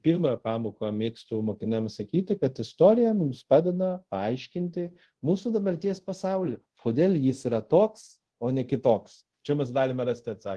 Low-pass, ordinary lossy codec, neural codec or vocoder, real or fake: 10.8 kHz; Opus, 16 kbps; codec, 24 kHz, 0.5 kbps, DualCodec; fake